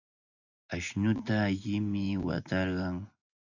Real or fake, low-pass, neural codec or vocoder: fake; 7.2 kHz; vocoder, 24 kHz, 100 mel bands, Vocos